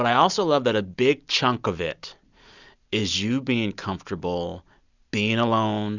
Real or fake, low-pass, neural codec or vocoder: real; 7.2 kHz; none